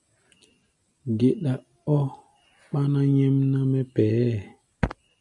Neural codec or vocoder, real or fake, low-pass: none; real; 10.8 kHz